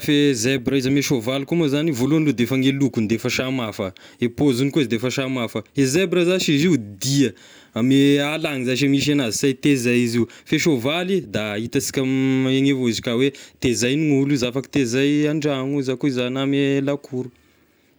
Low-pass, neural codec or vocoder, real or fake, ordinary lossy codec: none; none; real; none